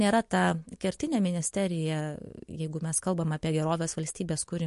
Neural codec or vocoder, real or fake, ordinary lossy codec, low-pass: none; real; MP3, 48 kbps; 14.4 kHz